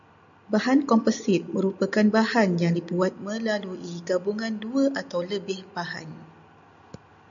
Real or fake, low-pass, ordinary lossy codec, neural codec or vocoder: real; 7.2 kHz; MP3, 96 kbps; none